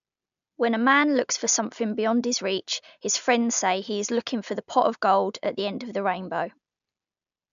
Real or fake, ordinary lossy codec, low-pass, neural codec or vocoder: real; AAC, 96 kbps; 7.2 kHz; none